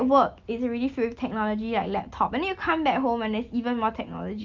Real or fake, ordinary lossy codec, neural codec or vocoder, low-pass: real; Opus, 24 kbps; none; 7.2 kHz